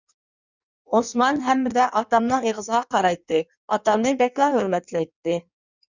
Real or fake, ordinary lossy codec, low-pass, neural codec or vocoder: fake; Opus, 64 kbps; 7.2 kHz; codec, 16 kHz in and 24 kHz out, 1.1 kbps, FireRedTTS-2 codec